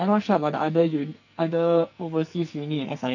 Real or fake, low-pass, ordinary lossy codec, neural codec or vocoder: fake; 7.2 kHz; none; codec, 44.1 kHz, 2.6 kbps, SNAC